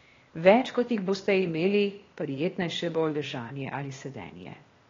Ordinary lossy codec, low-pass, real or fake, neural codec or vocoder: AAC, 32 kbps; 7.2 kHz; fake; codec, 16 kHz, 0.8 kbps, ZipCodec